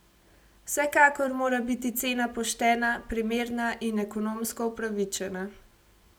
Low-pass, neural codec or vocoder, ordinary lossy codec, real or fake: none; none; none; real